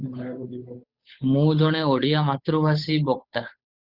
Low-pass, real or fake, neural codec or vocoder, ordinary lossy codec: 5.4 kHz; fake; codec, 16 kHz, 8 kbps, FunCodec, trained on Chinese and English, 25 frames a second; Opus, 64 kbps